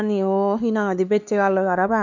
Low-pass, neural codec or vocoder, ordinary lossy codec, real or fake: 7.2 kHz; codec, 16 kHz, 4 kbps, X-Codec, HuBERT features, trained on LibriSpeech; none; fake